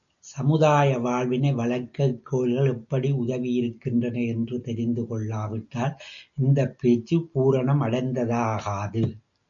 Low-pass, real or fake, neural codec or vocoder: 7.2 kHz; real; none